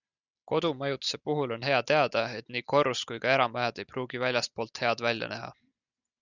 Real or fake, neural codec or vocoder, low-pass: real; none; 7.2 kHz